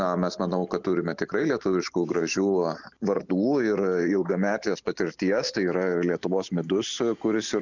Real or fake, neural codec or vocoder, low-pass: real; none; 7.2 kHz